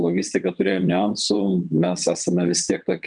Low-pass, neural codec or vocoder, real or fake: 9.9 kHz; vocoder, 22.05 kHz, 80 mel bands, WaveNeXt; fake